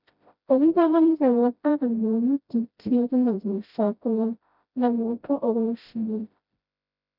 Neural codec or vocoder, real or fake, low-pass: codec, 16 kHz, 0.5 kbps, FreqCodec, smaller model; fake; 5.4 kHz